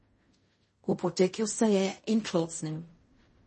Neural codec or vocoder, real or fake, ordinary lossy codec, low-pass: codec, 16 kHz in and 24 kHz out, 0.4 kbps, LongCat-Audio-Codec, fine tuned four codebook decoder; fake; MP3, 32 kbps; 10.8 kHz